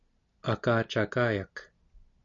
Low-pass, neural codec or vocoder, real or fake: 7.2 kHz; none; real